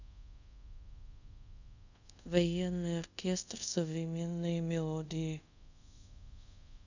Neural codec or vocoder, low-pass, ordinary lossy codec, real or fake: codec, 24 kHz, 0.5 kbps, DualCodec; 7.2 kHz; none; fake